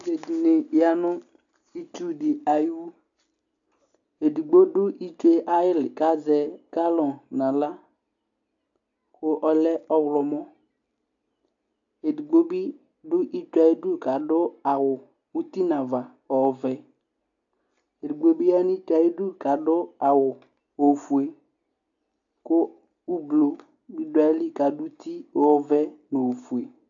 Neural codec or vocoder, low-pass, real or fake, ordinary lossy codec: none; 7.2 kHz; real; AAC, 64 kbps